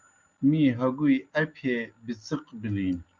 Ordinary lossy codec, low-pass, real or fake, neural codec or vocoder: Opus, 24 kbps; 7.2 kHz; real; none